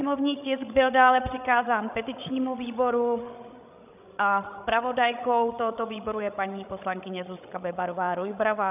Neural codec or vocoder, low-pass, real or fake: codec, 16 kHz, 16 kbps, FreqCodec, larger model; 3.6 kHz; fake